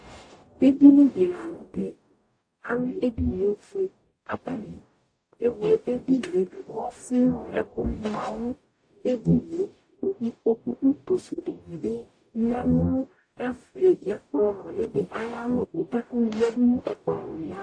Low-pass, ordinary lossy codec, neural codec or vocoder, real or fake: 9.9 kHz; AAC, 48 kbps; codec, 44.1 kHz, 0.9 kbps, DAC; fake